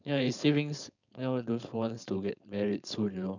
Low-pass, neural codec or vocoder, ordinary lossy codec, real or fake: 7.2 kHz; codec, 16 kHz, 4.8 kbps, FACodec; none; fake